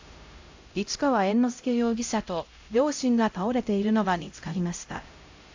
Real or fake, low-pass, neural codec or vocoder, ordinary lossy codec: fake; 7.2 kHz; codec, 16 kHz, 0.5 kbps, X-Codec, HuBERT features, trained on LibriSpeech; AAC, 48 kbps